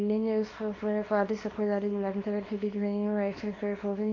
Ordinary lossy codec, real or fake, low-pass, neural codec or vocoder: AAC, 32 kbps; fake; 7.2 kHz; codec, 24 kHz, 0.9 kbps, WavTokenizer, small release